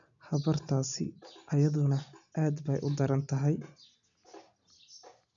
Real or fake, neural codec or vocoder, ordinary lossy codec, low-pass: real; none; none; 7.2 kHz